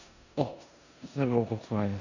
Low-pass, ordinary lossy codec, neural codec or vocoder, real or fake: 7.2 kHz; none; codec, 16 kHz in and 24 kHz out, 0.9 kbps, LongCat-Audio-Codec, four codebook decoder; fake